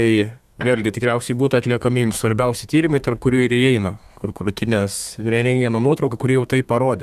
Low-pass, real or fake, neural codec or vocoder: 14.4 kHz; fake; codec, 32 kHz, 1.9 kbps, SNAC